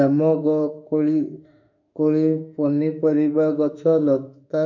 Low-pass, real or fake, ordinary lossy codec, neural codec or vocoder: 7.2 kHz; fake; none; autoencoder, 48 kHz, 32 numbers a frame, DAC-VAE, trained on Japanese speech